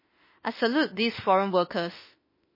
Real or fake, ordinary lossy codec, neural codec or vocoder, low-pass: fake; MP3, 24 kbps; autoencoder, 48 kHz, 32 numbers a frame, DAC-VAE, trained on Japanese speech; 5.4 kHz